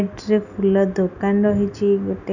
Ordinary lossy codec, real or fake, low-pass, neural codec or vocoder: none; real; 7.2 kHz; none